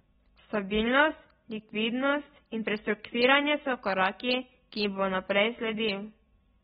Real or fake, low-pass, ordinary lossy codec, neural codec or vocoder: real; 9.9 kHz; AAC, 16 kbps; none